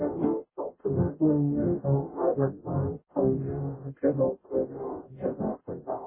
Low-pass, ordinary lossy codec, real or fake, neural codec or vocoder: 3.6 kHz; MP3, 16 kbps; fake; codec, 44.1 kHz, 0.9 kbps, DAC